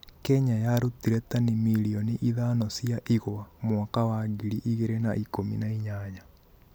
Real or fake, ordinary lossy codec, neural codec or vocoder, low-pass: real; none; none; none